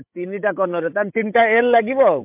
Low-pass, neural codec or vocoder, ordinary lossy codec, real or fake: 3.6 kHz; codec, 16 kHz, 16 kbps, FreqCodec, larger model; MP3, 32 kbps; fake